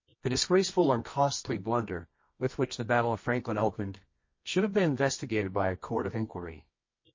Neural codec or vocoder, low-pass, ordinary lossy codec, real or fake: codec, 24 kHz, 0.9 kbps, WavTokenizer, medium music audio release; 7.2 kHz; MP3, 32 kbps; fake